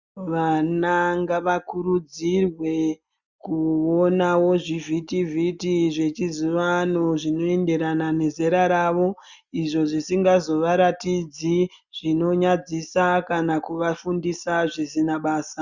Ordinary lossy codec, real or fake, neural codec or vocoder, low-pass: Opus, 64 kbps; real; none; 7.2 kHz